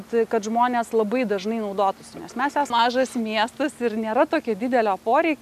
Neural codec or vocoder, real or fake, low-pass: none; real; 14.4 kHz